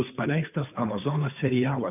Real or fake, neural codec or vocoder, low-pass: fake; codec, 16 kHz, 2 kbps, FunCodec, trained on Chinese and English, 25 frames a second; 3.6 kHz